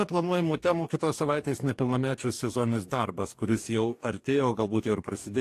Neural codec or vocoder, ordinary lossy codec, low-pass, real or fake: codec, 44.1 kHz, 2.6 kbps, DAC; AAC, 64 kbps; 14.4 kHz; fake